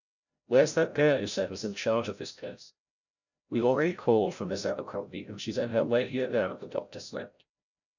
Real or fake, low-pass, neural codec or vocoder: fake; 7.2 kHz; codec, 16 kHz, 0.5 kbps, FreqCodec, larger model